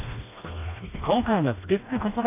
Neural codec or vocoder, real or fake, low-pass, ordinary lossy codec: codec, 16 kHz, 1 kbps, FreqCodec, smaller model; fake; 3.6 kHz; AAC, 24 kbps